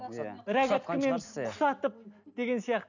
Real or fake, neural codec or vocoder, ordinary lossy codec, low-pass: real; none; none; 7.2 kHz